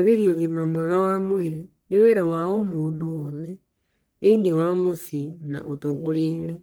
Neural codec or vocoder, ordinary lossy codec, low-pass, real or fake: codec, 44.1 kHz, 1.7 kbps, Pupu-Codec; none; none; fake